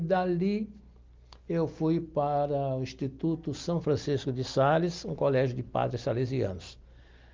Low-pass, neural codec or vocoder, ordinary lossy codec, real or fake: 7.2 kHz; none; Opus, 32 kbps; real